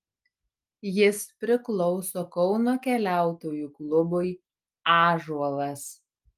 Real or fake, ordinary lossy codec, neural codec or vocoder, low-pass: real; Opus, 32 kbps; none; 14.4 kHz